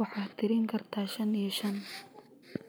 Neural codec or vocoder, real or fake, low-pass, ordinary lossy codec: none; real; none; none